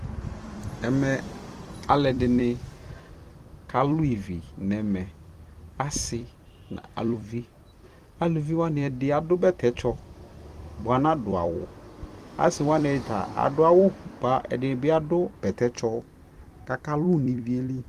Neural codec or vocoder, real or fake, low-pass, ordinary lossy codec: vocoder, 44.1 kHz, 128 mel bands every 256 samples, BigVGAN v2; fake; 14.4 kHz; Opus, 24 kbps